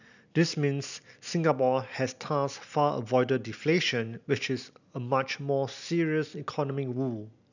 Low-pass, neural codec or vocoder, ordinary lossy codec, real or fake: 7.2 kHz; none; none; real